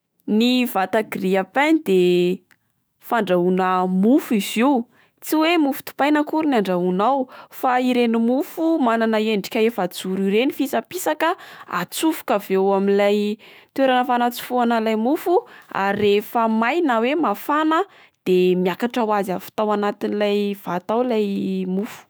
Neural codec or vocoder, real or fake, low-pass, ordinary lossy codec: autoencoder, 48 kHz, 128 numbers a frame, DAC-VAE, trained on Japanese speech; fake; none; none